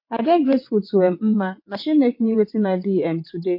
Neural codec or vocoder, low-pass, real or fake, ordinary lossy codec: vocoder, 22.05 kHz, 80 mel bands, WaveNeXt; 5.4 kHz; fake; MP3, 32 kbps